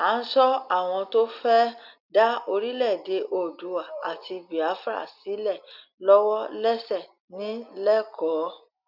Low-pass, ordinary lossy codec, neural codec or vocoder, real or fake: 5.4 kHz; none; none; real